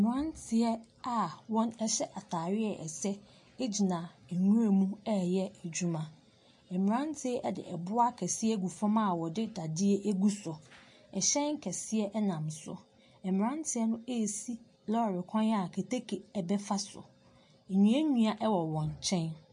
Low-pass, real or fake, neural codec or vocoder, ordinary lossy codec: 10.8 kHz; real; none; MP3, 48 kbps